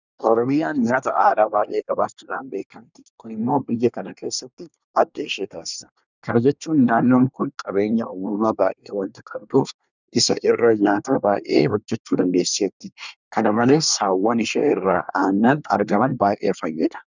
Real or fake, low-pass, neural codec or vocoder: fake; 7.2 kHz; codec, 24 kHz, 1 kbps, SNAC